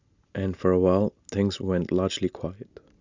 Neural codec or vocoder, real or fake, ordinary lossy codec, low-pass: none; real; Opus, 64 kbps; 7.2 kHz